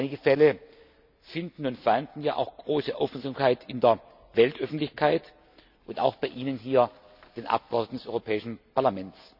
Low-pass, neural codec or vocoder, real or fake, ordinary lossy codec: 5.4 kHz; none; real; none